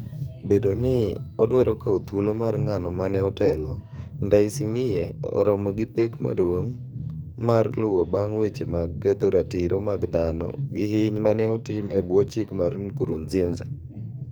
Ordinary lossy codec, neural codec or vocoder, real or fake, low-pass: none; codec, 44.1 kHz, 2.6 kbps, SNAC; fake; none